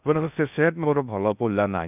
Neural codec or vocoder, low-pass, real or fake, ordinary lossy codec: codec, 16 kHz in and 24 kHz out, 0.6 kbps, FocalCodec, streaming, 2048 codes; 3.6 kHz; fake; none